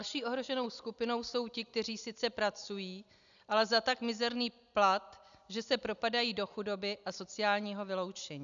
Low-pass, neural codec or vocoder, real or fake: 7.2 kHz; none; real